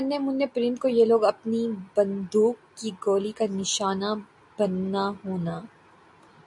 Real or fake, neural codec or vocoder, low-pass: real; none; 10.8 kHz